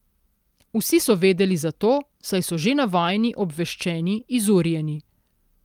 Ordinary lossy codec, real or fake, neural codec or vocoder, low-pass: Opus, 32 kbps; real; none; 19.8 kHz